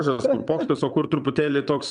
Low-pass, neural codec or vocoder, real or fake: 9.9 kHz; vocoder, 22.05 kHz, 80 mel bands, WaveNeXt; fake